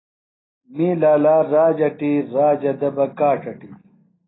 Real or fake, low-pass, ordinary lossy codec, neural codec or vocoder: real; 7.2 kHz; AAC, 16 kbps; none